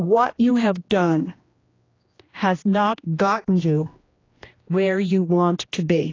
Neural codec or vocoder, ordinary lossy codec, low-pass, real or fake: codec, 16 kHz, 1 kbps, X-Codec, HuBERT features, trained on general audio; AAC, 32 kbps; 7.2 kHz; fake